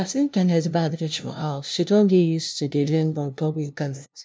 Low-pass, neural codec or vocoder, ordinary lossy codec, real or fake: none; codec, 16 kHz, 0.5 kbps, FunCodec, trained on LibriTTS, 25 frames a second; none; fake